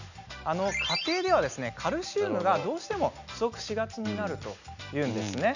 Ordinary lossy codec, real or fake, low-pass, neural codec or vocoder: none; real; 7.2 kHz; none